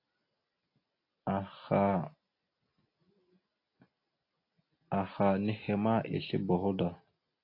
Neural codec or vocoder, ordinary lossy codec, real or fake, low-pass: none; AAC, 32 kbps; real; 5.4 kHz